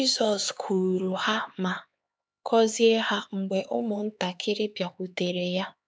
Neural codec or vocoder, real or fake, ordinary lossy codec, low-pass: codec, 16 kHz, 4 kbps, X-Codec, HuBERT features, trained on LibriSpeech; fake; none; none